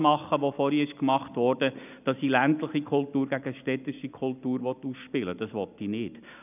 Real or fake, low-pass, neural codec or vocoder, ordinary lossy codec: real; 3.6 kHz; none; none